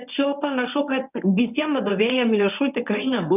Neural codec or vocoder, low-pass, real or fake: codec, 24 kHz, 0.9 kbps, WavTokenizer, medium speech release version 1; 3.6 kHz; fake